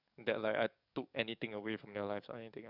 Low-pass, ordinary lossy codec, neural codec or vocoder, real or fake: 5.4 kHz; none; codec, 44.1 kHz, 7.8 kbps, DAC; fake